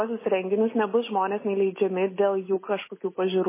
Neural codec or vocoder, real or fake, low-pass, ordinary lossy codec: none; real; 3.6 kHz; MP3, 16 kbps